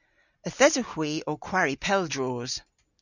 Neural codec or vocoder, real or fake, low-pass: none; real; 7.2 kHz